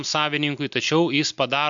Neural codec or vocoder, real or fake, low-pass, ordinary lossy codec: none; real; 7.2 kHz; MP3, 64 kbps